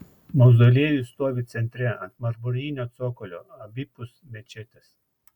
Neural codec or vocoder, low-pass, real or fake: none; 19.8 kHz; real